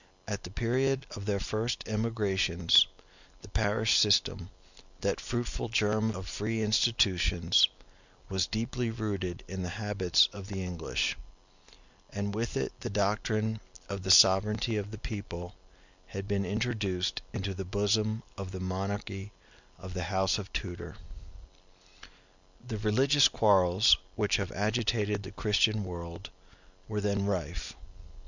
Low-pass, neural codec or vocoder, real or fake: 7.2 kHz; none; real